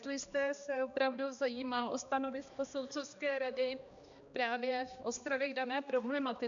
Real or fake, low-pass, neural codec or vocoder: fake; 7.2 kHz; codec, 16 kHz, 2 kbps, X-Codec, HuBERT features, trained on balanced general audio